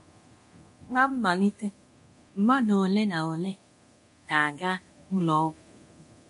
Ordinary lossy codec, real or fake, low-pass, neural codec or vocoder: MP3, 48 kbps; fake; 10.8 kHz; codec, 24 kHz, 0.9 kbps, DualCodec